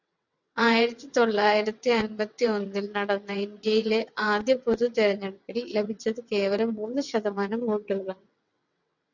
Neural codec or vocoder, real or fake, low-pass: vocoder, 22.05 kHz, 80 mel bands, WaveNeXt; fake; 7.2 kHz